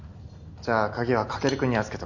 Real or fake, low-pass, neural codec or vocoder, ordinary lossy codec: real; 7.2 kHz; none; none